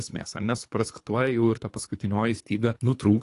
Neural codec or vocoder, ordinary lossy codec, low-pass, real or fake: codec, 24 kHz, 3 kbps, HILCodec; AAC, 48 kbps; 10.8 kHz; fake